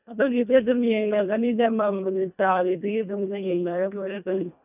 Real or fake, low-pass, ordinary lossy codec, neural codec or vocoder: fake; 3.6 kHz; none; codec, 24 kHz, 1.5 kbps, HILCodec